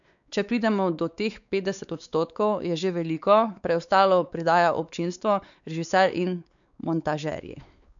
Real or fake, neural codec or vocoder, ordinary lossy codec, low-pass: fake; codec, 16 kHz, 4 kbps, X-Codec, WavLM features, trained on Multilingual LibriSpeech; none; 7.2 kHz